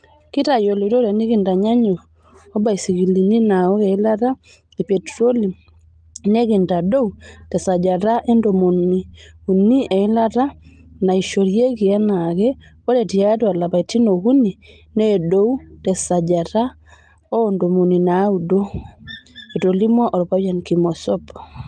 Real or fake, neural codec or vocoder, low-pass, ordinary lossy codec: real; none; 9.9 kHz; Opus, 32 kbps